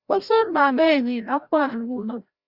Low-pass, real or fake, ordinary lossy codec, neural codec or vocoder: 5.4 kHz; fake; Opus, 64 kbps; codec, 16 kHz, 0.5 kbps, FreqCodec, larger model